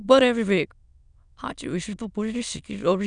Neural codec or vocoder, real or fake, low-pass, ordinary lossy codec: autoencoder, 22.05 kHz, a latent of 192 numbers a frame, VITS, trained on many speakers; fake; 9.9 kHz; none